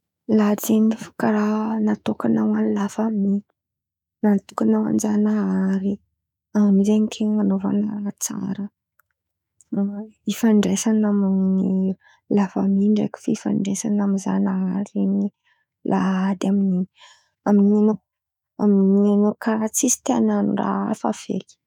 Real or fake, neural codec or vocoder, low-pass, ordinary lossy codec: fake; autoencoder, 48 kHz, 128 numbers a frame, DAC-VAE, trained on Japanese speech; 19.8 kHz; none